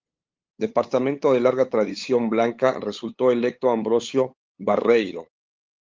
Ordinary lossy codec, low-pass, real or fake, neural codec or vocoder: Opus, 32 kbps; 7.2 kHz; fake; codec, 16 kHz, 8 kbps, FunCodec, trained on LibriTTS, 25 frames a second